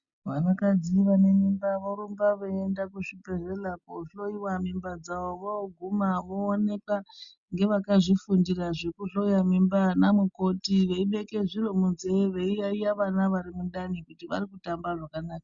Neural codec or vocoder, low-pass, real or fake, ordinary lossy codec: none; 7.2 kHz; real; Opus, 64 kbps